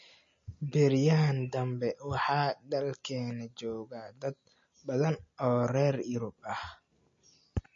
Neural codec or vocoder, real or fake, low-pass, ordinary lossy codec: none; real; 7.2 kHz; MP3, 32 kbps